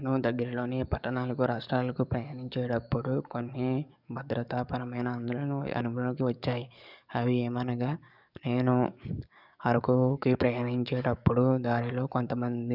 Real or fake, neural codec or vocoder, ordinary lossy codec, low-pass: fake; codec, 44.1 kHz, 7.8 kbps, DAC; none; 5.4 kHz